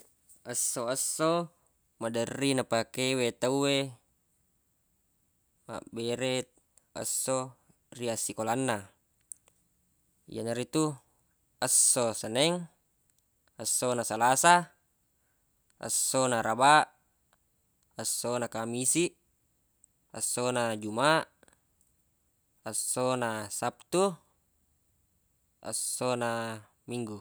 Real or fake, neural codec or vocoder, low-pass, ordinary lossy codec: real; none; none; none